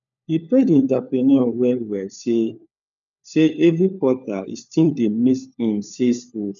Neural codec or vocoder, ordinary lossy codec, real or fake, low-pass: codec, 16 kHz, 4 kbps, FunCodec, trained on LibriTTS, 50 frames a second; none; fake; 7.2 kHz